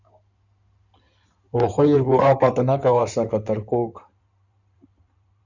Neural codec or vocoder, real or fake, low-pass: codec, 16 kHz in and 24 kHz out, 2.2 kbps, FireRedTTS-2 codec; fake; 7.2 kHz